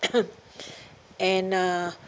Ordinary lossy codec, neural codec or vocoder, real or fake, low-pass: none; none; real; none